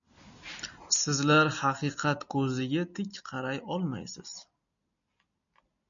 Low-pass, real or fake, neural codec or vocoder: 7.2 kHz; real; none